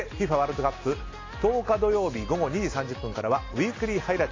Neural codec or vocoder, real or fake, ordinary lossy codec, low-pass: none; real; AAC, 32 kbps; 7.2 kHz